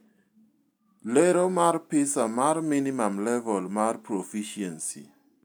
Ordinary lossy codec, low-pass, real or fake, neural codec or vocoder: none; none; real; none